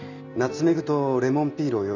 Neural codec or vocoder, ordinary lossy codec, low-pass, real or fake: none; none; 7.2 kHz; real